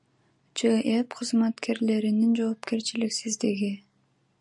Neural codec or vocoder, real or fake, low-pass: none; real; 10.8 kHz